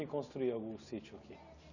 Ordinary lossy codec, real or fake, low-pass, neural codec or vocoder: none; real; 7.2 kHz; none